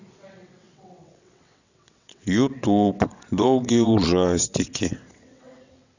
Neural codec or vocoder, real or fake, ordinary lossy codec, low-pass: vocoder, 22.05 kHz, 80 mel bands, WaveNeXt; fake; none; 7.2 kHz